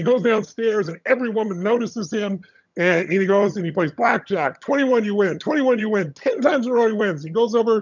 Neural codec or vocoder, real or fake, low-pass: vocoder, 22.05 kHz, 80 mel bands, HiFi-GAN; fake; 7.2 kHz